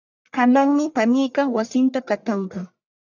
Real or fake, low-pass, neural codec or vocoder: fake; 7.2 kHz; codec, 44.1 kHz, 1.7 kbps, Pupu-Codec